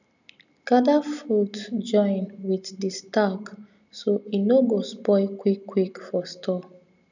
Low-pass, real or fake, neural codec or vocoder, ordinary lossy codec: 7.2 kHz; real; none; none